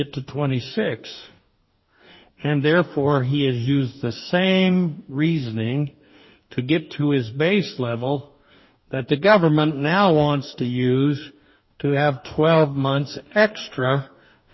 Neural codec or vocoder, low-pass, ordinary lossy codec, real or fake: codec, 44.1 kHz, 2.6 kbps, DAC; 7.2 kHz; MP3, 24 kbps; fake